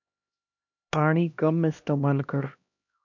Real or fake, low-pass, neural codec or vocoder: fake; 7.2 kHz; codec, 16 kHz, 1 kbps, X-Codec, HuBERT features, trained on LibriSpeech